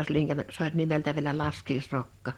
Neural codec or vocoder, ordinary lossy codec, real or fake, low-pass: none; Opus, 16 kbps; real; 19.8 kHz